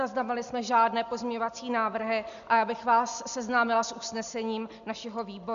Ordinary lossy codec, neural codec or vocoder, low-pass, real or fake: MP3, 96 kbps; none; 7.2 kHz; real